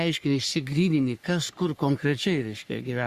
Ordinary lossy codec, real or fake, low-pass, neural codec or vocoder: Opus, 64 kbps; fake; 14.4 kHz; codec, 44.1 kHz, 3.4 kbps, Pupu-Codec